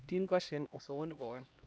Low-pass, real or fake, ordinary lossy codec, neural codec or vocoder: none; fake; none; codec, 16 kHz, 1 kbps, X-Codec, HuBERT features, trained on LibriSpeech